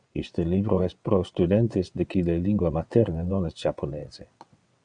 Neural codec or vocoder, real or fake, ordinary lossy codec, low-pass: vocoder, 22.05 kHz, 80 mel bands, WaveNeXt; fake; MP3, 96 kbps; 9.9 kHz